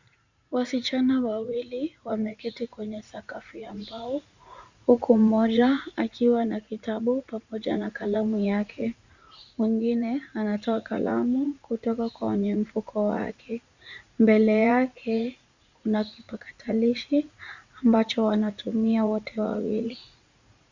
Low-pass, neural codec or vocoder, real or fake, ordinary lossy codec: 7.2 kHz; vocoder, 44.1 kHz, 80 mel bands, Vocos; fake; Opus, 64 kbps